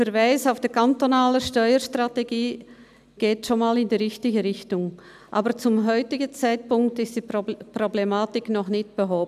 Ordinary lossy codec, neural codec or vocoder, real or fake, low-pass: none; none; real; 14.4 kHz